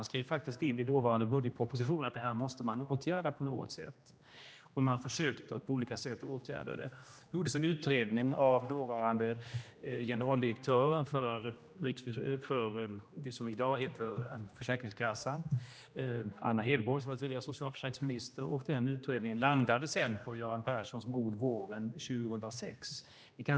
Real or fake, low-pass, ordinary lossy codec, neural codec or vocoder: fake; none; none; codec, 16 kHz, 1 kbps, X-Codec, HuBERT features, trained on general audio